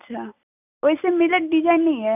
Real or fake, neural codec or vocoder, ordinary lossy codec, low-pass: real; none; none; 3.6 kHz